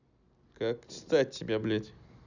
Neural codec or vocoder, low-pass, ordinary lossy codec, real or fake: none; 7.2 kHz; none; real